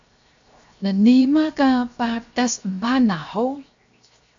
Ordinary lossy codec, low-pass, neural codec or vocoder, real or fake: AAC, 64 kbps; 7.2 kHz; codec, 16 kHz, 0.7 kbps, FocalCodec; fake